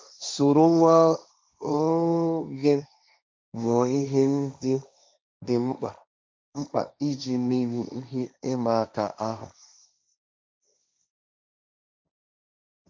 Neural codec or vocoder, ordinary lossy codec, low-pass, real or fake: codec, 16 kHz, 1.1 kbps, Voila-Tokenizer; none; none; fake